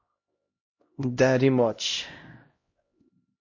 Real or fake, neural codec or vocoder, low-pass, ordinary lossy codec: fake; codec, 16 kHz, 0.5 kbps, X-Codec, HuBERT features, trained on LibriSpeech; 7.2 kHz; MP3, 32 kbps